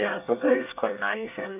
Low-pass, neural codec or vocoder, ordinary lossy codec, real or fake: 3.6 kHz; codec, 24 kHz, 1 kbps, SNAC; none; fake